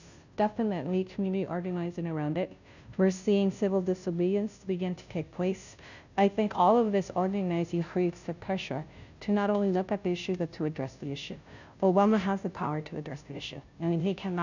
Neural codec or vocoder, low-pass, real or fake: codec, 16 kHz, 0.5 kbps, FunCodec, trained on Chinese and English, 25 frames a second; 7.2 kHz; fake